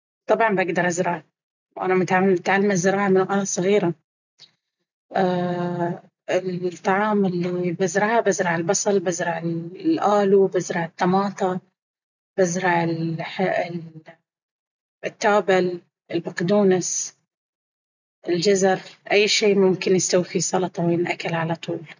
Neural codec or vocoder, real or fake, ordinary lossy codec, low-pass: none; real; none; 7.2 kHz